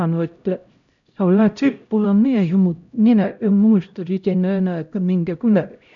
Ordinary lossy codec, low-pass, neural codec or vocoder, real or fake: none; 7.2 kHz; codec, 16 kHz, 0.5 kbps, X-Codec, HuBERT features, trained on LibriSpeech; fake